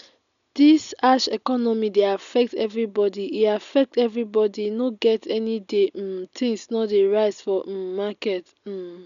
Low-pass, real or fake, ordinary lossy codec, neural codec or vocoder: 7.2 kHz; real; none; none